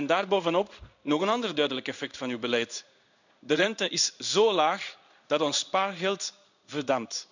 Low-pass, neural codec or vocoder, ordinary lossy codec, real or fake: 7.2 kHz; codec, 16 kHz in and 24 kHz out, 1 kbps, XY-Tokenizer; none; fake